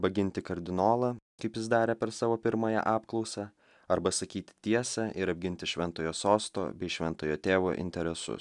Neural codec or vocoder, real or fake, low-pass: none; real; 10.8 kHz